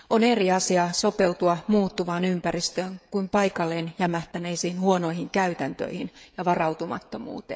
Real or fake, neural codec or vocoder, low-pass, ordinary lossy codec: fake; codec, 16 kHz, 16 kbps, FreqCodec, smaller model; none; none